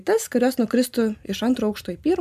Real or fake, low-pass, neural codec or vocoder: real; 14.4 kHz; none